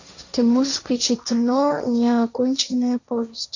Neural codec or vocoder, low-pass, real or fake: codec, 16 kHz, 1.1 kbps, Voila-Tokenizer; 7.2 kHz; fake